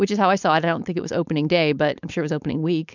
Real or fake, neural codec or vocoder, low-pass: fake; codec, 16 kHz, 4.8 kbps, FACodec; 7.2 kHz